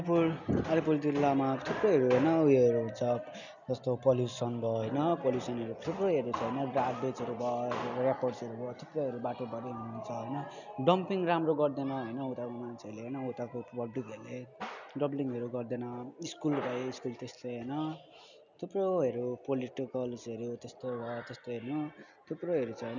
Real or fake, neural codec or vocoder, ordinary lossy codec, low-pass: real; none; none; 7.2 kHz